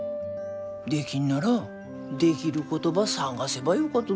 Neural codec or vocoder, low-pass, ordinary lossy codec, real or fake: none; none; none; real